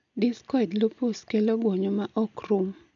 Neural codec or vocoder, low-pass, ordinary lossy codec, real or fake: none; 7.2 kHz; none; real